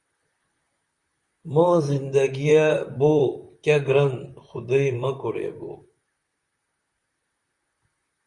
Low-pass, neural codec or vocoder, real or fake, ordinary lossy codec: 10.8 kHz; vocoder, 44.1 kHz, 128 mel bands, Pupu-Vocoder; fake; Opus, 64 kbps